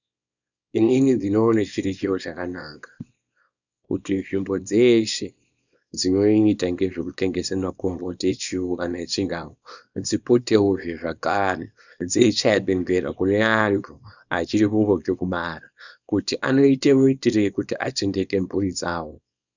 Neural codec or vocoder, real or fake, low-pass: codec, 24 kHz, 0.9 kbps, WavTokenizer, small release; fake; 7.2 kHz